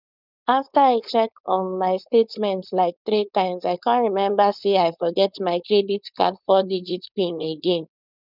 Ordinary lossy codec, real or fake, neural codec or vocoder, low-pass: none; fake; codec, 16 kHz, 4.8 kbps, FACodec; 5.4 kHz